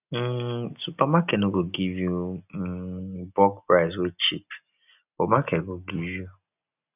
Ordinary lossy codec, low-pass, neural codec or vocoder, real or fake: none; 3.6 kHz; none; real